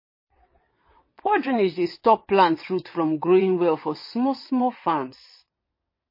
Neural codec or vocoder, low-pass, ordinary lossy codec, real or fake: vocoder, 22.05 kHz, 80 mel bands, WaveNeXt; 5.4 kHz; MP3, 24 kbps; fake